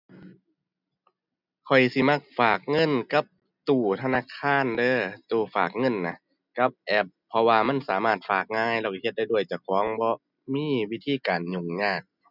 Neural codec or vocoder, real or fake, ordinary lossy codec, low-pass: none; real; none; 5.4 kHz